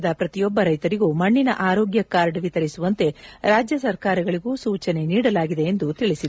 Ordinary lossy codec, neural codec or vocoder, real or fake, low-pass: none; none; real; none